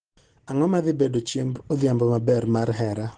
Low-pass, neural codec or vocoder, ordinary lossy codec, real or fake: 9.9 kHz; none; Opus, 16 kbps; real